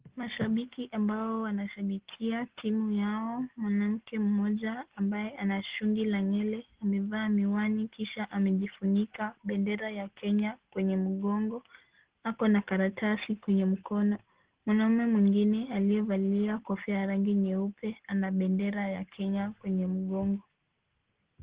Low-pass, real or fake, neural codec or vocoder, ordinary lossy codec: 3.6 kHz; real; none; Opus, 16 kbps